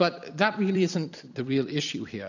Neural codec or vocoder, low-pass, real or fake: vocoder, 44.1 kHz, 128 mel bands every 256 samples, BigVGAN v2; 7.2 kHz; fake